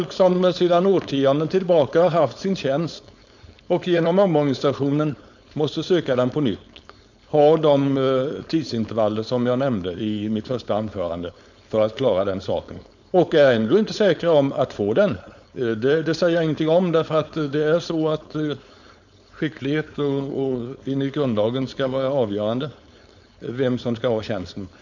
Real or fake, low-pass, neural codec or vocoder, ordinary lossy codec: fake; 7.2 kHz; codec, 16 kHz, 4.8 kbps, FACodec; none